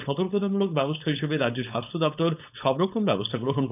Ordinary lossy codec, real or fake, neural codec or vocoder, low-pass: none; fake; codec, 16 kHz, 4.8 kbps, FACodec; 3.6 kHz